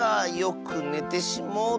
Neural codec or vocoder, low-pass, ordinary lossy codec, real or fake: none; none; none; real